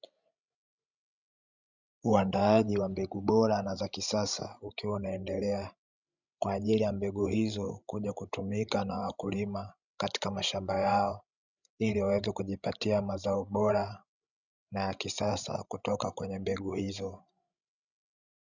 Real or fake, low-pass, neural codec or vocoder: fake; 7.2 kHz; codec, 16 kHz, 16 kbps, FreqCodec, larger model